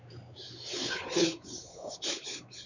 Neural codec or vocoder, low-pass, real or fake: codec, 16 kHz, 4 kbps, X-Codec, WavLM features, trained on Multilingual LibriSpeech; 7.2 kHz; fake